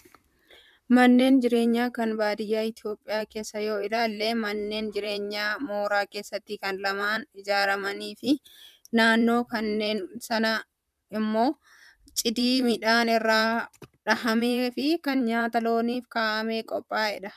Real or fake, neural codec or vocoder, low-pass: fake; vocoder, 44.1 kHz, 128 mel bands, Pupu-Vocoder; 14.4 kHz